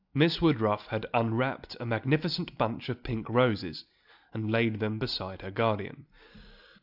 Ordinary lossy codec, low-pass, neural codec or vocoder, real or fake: AAC, 48 kbps; 5.4 kHz; none; real